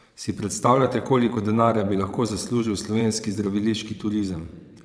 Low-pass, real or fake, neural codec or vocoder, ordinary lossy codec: none; fake; vocoder, 22.05 kHz, 80 mel bands, WaveNeXt; none